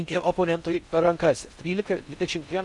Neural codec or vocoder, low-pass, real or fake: codec, 16 kHz in and 24 kHz out, 0.6 kbps, FocalCodec, streaming, 4096 codes; 10.8 kHz; fake